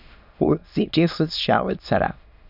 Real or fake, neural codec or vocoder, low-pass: fake; autoencoder, 22.05 kHz, a latent of 192 numbers a frame, VITS, trained on many speakers; 5.4 kHz